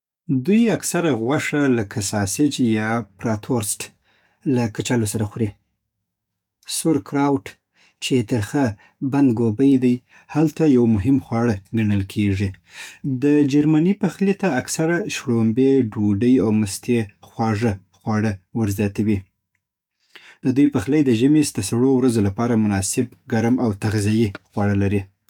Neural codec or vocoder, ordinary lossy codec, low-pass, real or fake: codec, 44.1 kHz, 7.8 kbps, DAC; none; 19.8 kHz; fake